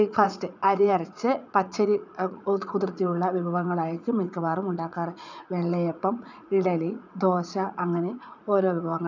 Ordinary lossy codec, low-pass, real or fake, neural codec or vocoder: none; 7.2 kHz; fake; vocoder, 44.1 kHz, 80 mel bands, Vocos